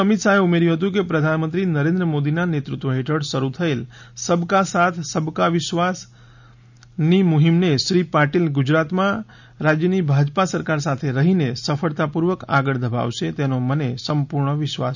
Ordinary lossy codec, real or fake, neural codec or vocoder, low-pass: none; real; none; 7.2 kHz